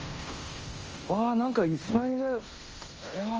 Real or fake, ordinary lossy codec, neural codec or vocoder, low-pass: fake; Opus, 24 kbps; codec, 16 kHz in and 24 kHz out, 0.9 kbps, LongCat-Audio-Codec, fine tuned four codebook decoder; 7.2 kHz